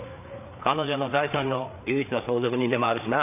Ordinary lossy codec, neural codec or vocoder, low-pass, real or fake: none; codec, 16 kHz, 4 kbps, FreqCodec, larger model; 3.6 kHz; fake